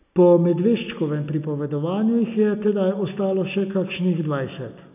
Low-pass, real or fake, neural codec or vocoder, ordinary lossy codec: 3.6 kHz; real; none; none